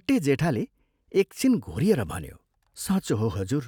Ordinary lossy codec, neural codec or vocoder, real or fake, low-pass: none; none; real; 19.8 kHz